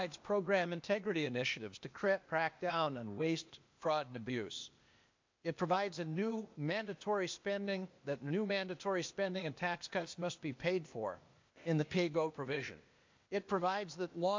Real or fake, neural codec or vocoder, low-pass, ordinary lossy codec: fake; codec, 16 kHz, 0.8 kbps, ZipCodec; 7.2 kHz; MP3, 48 kbps